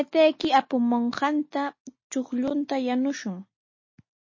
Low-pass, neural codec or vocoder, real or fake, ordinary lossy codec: 7.2 kHz; none; real; MP3, 32 kbps